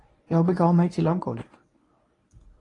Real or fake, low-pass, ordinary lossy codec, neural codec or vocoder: fake; 10.8 kHz; AAC, 32 kbps; codec, 24 kHz, 0.9 kbps, WavTokenizer, medium speech release version 1